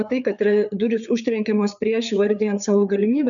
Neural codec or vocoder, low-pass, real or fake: codec, 16 kHz, 4 kbps, FreqCodec, larger model; 7.2 kHz; fake